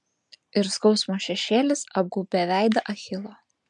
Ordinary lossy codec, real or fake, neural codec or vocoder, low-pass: MP3, 64 kbps; real; none; 10.8 kHz